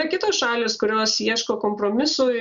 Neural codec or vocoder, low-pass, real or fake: none; 7.2 kHz; real